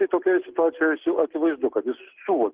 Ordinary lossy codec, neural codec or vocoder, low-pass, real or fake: Opus, 16 kbps; none; 3.6 kHz; real